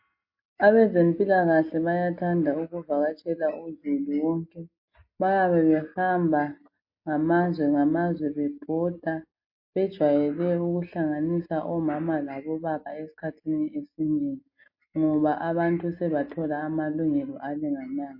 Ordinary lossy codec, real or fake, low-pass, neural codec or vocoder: MP3, 32 kbps; real; 5.4 kHz; none